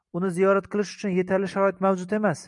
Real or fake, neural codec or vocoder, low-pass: real; none; 10.8 kHz